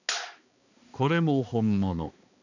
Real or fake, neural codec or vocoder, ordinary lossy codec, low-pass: fake; codec, 16 kHz, 2 kbps, X-Codec, HuBERT features, trained on balanced general audio; none; 7.2 kHz